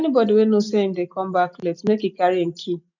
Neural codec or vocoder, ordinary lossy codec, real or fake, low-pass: none; none; real; 7.2 kHz